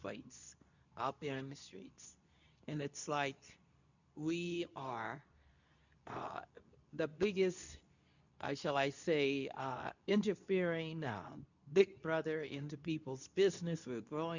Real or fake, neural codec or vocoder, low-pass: fake; codec, 24 kHz, 0.9 kbps, WavTokenizer, medium speech release version 2; 7.2 kHz